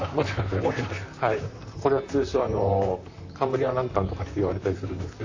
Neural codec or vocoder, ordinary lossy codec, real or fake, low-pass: vocoder, 44.1 kHz, 128 mel bands, Pupu-Vocoder; AAC, 48 kbps; fake; 7.2 kHz